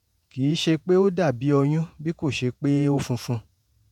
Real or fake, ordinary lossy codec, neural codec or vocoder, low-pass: fake; none; vocoder, 48 kHz, 128 mel bands, Vocos; 19.8 kHz